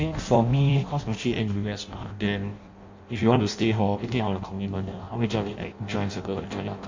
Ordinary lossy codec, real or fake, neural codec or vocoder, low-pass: MP3, 48 kbps; fake; codec, 16 kHz in and 24 kHz out, 0.6 kbps, FireRedTTS-2 codec; 7.2 kHz